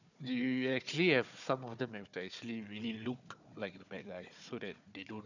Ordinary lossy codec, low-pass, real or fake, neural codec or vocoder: none; 7.2 kHz; fake; codec, 16 kHz, 4 kbps, FunCodec, trained on Chinese and English, 50 frames a second